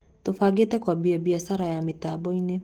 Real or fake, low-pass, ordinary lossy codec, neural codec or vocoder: fake; 19.8 kHz; Opus, 16 kbps; autoencoder, 48 kHz, 128 numbers a frame, DAC-VAE, trained on Japanese speech